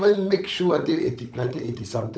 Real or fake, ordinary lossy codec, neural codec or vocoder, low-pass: fake; none; codec, 16 kHz, 16 kbps, FunCodec, trained on LibriTTS, 50 frames a second; none